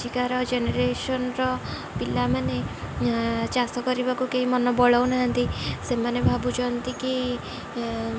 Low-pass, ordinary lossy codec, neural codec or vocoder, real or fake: none; none; none; real